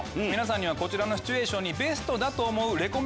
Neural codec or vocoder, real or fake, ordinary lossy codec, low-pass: none; real; none; none